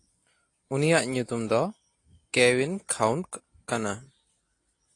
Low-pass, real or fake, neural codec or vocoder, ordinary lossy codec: 10.8 kHz; real; none; AAC, 48 kbps